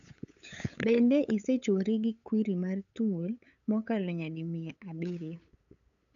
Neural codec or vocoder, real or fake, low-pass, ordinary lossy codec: codec, 16 kHz, 8 kbps, FunCodec, trained on LibriTTS, 25 frames a second; fake; 7.2 kHz; none